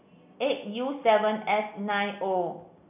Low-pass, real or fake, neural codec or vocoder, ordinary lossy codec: 3.6 kHz; real; none; none